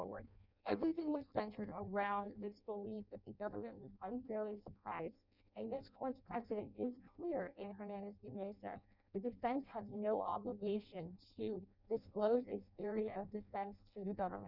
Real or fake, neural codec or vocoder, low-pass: fake; codec, 16 kHz in and 24 kHz out, 0.6 kbps, FireRedTTS-2 codec; 5.4 kHz